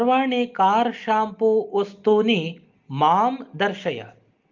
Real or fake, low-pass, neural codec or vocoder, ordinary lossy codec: real; 7.2 kHz; none; Opus, 24 kbps